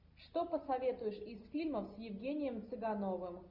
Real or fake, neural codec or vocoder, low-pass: real; none; 5.4 kHz